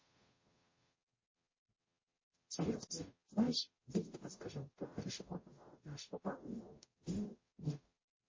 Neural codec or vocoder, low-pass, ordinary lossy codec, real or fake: codec, 44.1 kHz, 0.9 kbps, DAC; 7.2 kHz; MP3, 32 kbps; fake